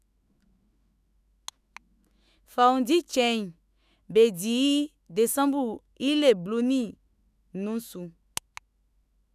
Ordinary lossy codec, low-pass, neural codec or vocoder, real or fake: none; 14.4 kHz; autoencoder, 48 kHz, 128 numbers a frame, DAC-VAE, trained on Japanese speech; fake